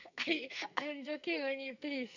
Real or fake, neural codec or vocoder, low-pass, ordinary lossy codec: fake; codec, 44.1 kHz, 2.6 kbps, SNAC; 7.2 kHz; none